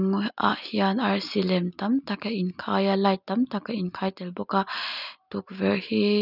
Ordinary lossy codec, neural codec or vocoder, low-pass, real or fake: none; none; 5.4 kHz; real